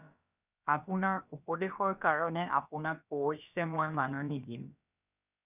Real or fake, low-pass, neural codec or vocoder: fake; 3.6 kHz; codec, 16 kHz, about 1 kbps, DyCAST, with the encoder's durations